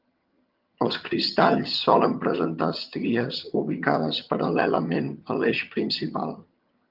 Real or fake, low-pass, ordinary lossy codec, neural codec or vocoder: fake; 5.4 kHz; Opus, 32 kbps; vocoder, 22.05 kHz, 80 mel bands, HiFi-GAN